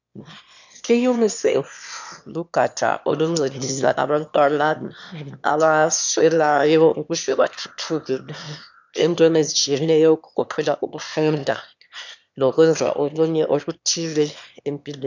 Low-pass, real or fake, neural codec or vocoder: 7.2 kHz; fake; autoencoder, 22.05 kHz, a latent of 192 numbers a frame, VITS, trained on one speaker